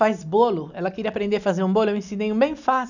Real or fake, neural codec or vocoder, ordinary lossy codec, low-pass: real; none; none; 7.2 kHz